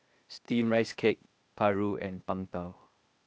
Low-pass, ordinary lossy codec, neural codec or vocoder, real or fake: none; none; codec, 16 kHz, 0.8 kbps, ZipCodec; fake